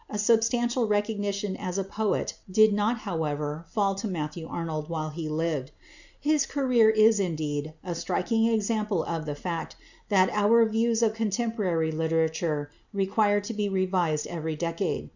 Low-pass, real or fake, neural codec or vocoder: 7.2 kHz; real; none